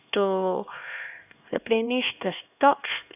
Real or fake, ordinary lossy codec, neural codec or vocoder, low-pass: fake; none; codec, 16 kHz, 2 kbps, X-Codec, HuBERT features, trained on LibriSpeech; 3.6 kHz